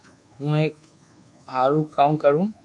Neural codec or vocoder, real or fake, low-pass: codec, 24 kHz, 1.2 kbps, DualCodec; fake; 10.8 kHz